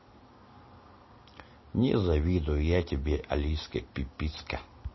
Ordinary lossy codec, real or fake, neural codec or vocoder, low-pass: MP3, 24 kbps; real; none; 7.2 kHz